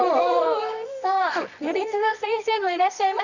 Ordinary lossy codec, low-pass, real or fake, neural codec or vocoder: none; 7.2 kHz; fake; codec, 24 kHz, 0.9 kbps, WavTokenizer, medium music audio release